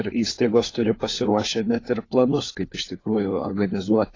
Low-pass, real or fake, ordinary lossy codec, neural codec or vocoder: 7.2 kHz; fake; AAC, 32 kbps; codec, 16 kHz, 4 kbps, FunCodec, trained on LibriTTS, 50 frames a second